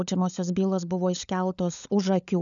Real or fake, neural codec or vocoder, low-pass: fake; codec, 16 kHz, 16 kbps, FunCodec, trained on LibriTTS, 50 frames a second; 7.2 kHz